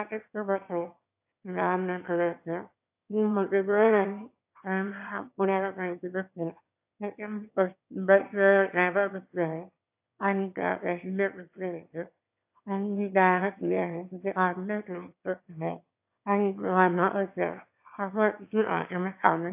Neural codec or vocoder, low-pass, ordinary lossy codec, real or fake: autoencoder, 22.05 kHz, a latent of 192 numbers a frame, VITS, trained on one speaker; 3.6 kHz; AAC, 32 kbps; fake